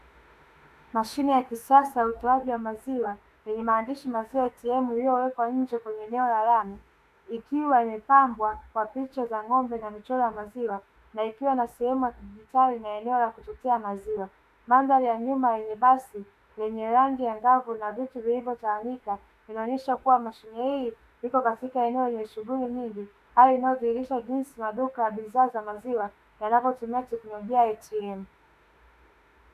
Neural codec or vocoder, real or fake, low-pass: autoencoder, 48 kHz, 32 numbers a frame, DAC-VAE, trained on Japanese speech; fake; 14.4 kHz